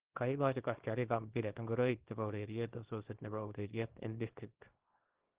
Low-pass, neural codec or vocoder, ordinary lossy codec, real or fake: 3.6 kHz; codec, 24 kHz, 0.9 kbps, WavTokenizer, small release; Opus, 16 kbps; fake